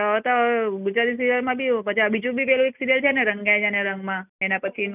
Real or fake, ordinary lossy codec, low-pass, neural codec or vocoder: real; none; 3.6 kHz; none